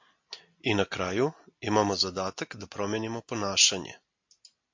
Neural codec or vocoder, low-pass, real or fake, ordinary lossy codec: none; 7.2 kHz; real; MP3, 48 kbps